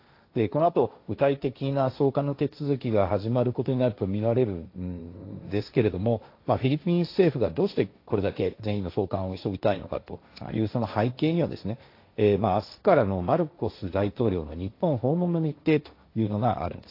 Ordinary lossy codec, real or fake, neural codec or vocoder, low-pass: AAC, 32 kbps; fake; codec, 16 kHz, 1.1 kbps, Voila-Tokenizer; 5.4 kHz